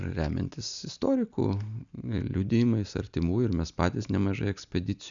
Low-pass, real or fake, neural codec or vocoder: 7.2 kHz; real; none